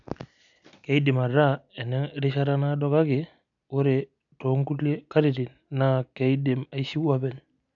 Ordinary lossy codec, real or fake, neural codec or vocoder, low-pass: none; real; none; 7.2 kHz